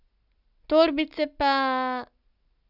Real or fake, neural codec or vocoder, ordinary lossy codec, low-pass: real; none; none; 5.4 kHz